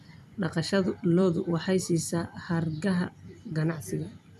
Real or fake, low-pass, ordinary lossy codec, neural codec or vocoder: fake; 14.4 kHz; none; vocoder, 44.1 kHz, 128 mel bands every 256 samples, BigVGAN v2